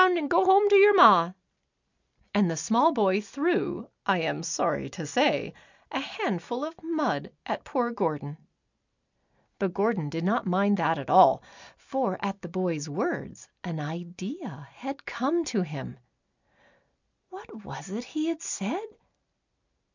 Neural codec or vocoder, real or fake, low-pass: none; real; 7.2 kHz